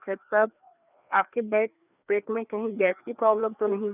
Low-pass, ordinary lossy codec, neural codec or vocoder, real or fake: 3.6 kHz; none; codec, 16 kHz, 2 kbps, FreqCodec, larger model; fake